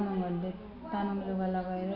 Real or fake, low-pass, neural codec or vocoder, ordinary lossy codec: real; 5.4 kHz; none; none